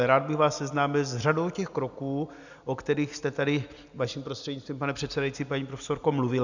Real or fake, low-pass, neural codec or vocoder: real; 7.2 kHz; none